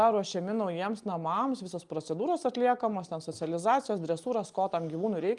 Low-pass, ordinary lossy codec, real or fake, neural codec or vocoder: 10.8 kHz; Opus, 32 kbps; real; none